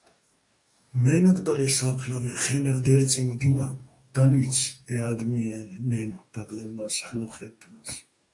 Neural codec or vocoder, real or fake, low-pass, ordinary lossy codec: codec, 44.1 kHz, 2.6 kbps, DAC; fake; 10.8 kHz; AAC, 64 kbps